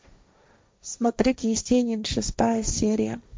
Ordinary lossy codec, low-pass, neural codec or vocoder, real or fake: none; none; codec, 16 kHz, 1.1 kbps, Voila-Tokenizer; fake